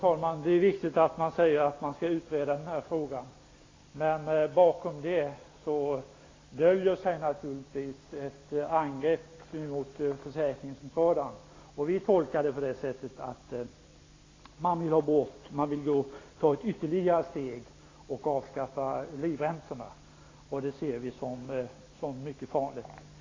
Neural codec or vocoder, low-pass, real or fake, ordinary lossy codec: none; 7.2 kHz; real; AAC, 32 kbps